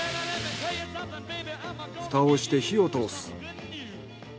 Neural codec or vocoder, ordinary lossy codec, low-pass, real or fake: none; none; none; real